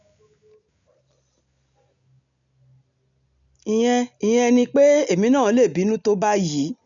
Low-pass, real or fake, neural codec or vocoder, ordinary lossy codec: 7.2 kHz; real; none; none